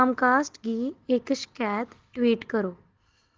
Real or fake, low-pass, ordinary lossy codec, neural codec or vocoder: real; 7.2 kHz; Opus, 16 kbps; none